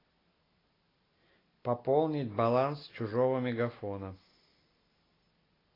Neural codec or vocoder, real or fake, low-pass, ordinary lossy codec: none; real; 5.4 kHz; AAC, 24 kbps